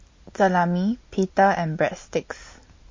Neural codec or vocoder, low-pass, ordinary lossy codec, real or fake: none; 7.2 kHz; MP3, 32 kbps; real